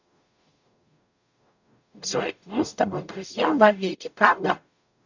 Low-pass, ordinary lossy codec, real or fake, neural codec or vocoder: 7.2 kHz; none; fake; codec, 44.1 kHz, 0.9 kbps, DAC